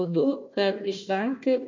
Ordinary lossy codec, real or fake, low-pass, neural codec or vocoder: MP3, 48 kbps; fake; 7.2 kHz; codec, 16 kHz, 1 kbps, FunCodec, trained on Chinese and English, 50 frames a second